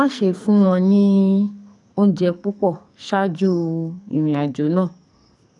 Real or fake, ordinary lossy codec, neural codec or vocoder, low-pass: fake; none; codec, 44.1 kHz, 2.6 kbps, SNAC; 10.8 kHz